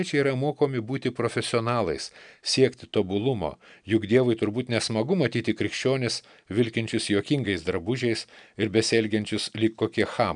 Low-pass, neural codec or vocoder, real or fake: 9.9 kHz; none; real